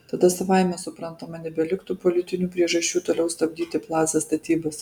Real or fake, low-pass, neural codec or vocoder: real; 19.8 kHz; none